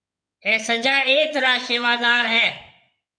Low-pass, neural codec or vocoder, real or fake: 9.9 kHz; codec, 16 kHz in and 24 kHz out, 2.2 kbps, FireRedTTS-2 codec; fake